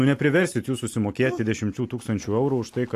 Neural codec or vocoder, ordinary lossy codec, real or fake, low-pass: none; AAC, 64 kbps; real; 14.4 kHz